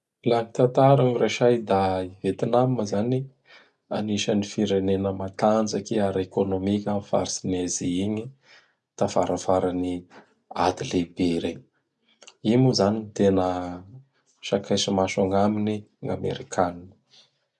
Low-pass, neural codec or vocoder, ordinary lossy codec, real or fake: none; none; none; real